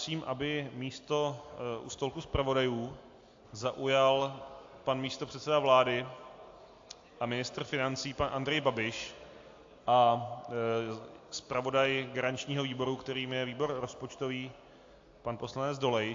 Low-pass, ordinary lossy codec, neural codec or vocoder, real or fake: 7.2 kHz; AAC, 48 kbps; none; real